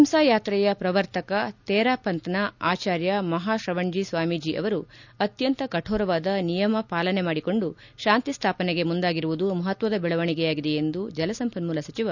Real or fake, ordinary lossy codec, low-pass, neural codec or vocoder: real; none; 7.2 kHz; none